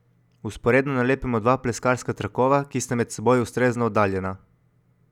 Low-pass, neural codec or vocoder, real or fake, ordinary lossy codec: 19.8 kHz; none; real; none